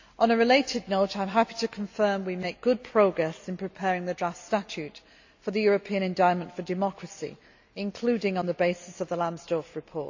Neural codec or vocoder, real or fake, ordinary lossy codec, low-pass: none; real; AAC, 48 kbps; 7.2 kHz